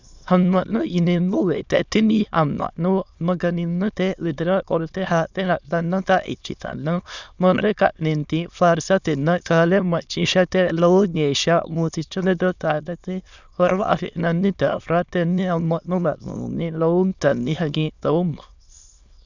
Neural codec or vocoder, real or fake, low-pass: autoencoder, 22.05 kHz, a latent of 192 numbers a frame, VITS, trained on many speakers; fake; 7.2 kHz